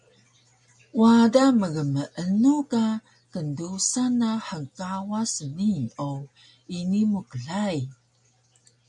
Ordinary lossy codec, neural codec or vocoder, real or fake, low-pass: MP3, 96 kbps; none; real; 10.8 kHz